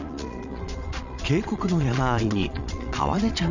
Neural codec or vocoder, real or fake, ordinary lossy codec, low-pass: vocoder, 22.05 kHz, 80 mel bands, Vocos; fake; none; 7.2 kHz